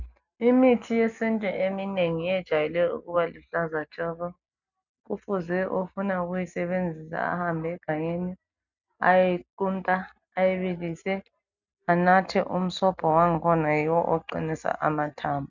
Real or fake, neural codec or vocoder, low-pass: real; none; 7.2 kHz